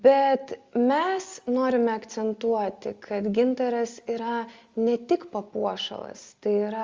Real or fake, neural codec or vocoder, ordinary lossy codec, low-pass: real; none; Opus, 32 kbps; 7.2 kHz